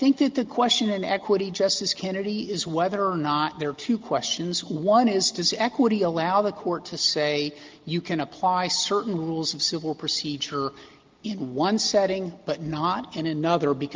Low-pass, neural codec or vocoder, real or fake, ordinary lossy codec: 7.2 kHz; none; real; Opus, 32 kbps